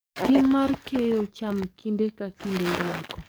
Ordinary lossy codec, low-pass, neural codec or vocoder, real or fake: none; none; codec, 44.1 kHz, 7.8 kbps, DAC; fake